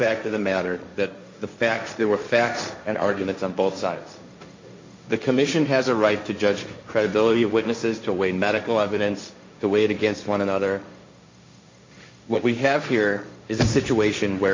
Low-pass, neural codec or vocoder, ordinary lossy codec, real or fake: 7.2 kHz; codec, 16 kHz, 1.1 kbps, Voila-Tokenizer; MP3, 48 kbps; fake